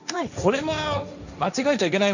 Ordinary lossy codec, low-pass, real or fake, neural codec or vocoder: none; 7.2 kHz; fake; codec, 16 kHz, 1.1 kbps, Voila-Tokenizer